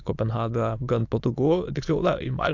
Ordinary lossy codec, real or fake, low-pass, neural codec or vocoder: AAC, 48 kbps; fake; 7.2 kHz; autoencoder, 22.05 kHz, a latent of 192 numbers a frame, VITS, trained on many speakers